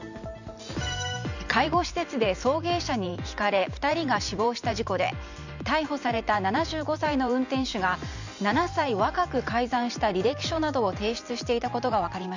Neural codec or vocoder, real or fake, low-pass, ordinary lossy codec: vocoder, 44.1 kHz, 128 mel bands every 512 samples, BigVGAN v2; fake; 7.2 kHz; none